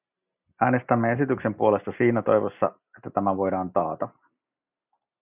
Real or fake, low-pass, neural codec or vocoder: real; 3.6 kHz; none